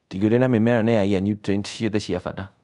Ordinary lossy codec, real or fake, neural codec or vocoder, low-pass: none; fake; codec, 24 kHz, 0.5 kbps, DualCodec; 10.8 kHz